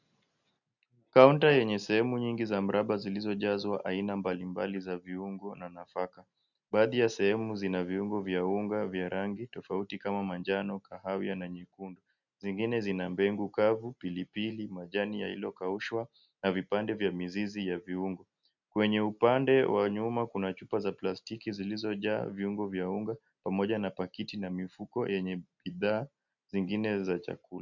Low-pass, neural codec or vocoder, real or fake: 7.2 kHz; none; real